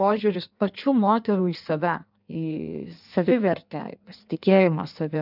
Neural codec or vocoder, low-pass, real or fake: codec, 16 kHz in and 24 kHz out, 1.1 kbps, FireRedTTS-2 codec; 5.4 kHz; fake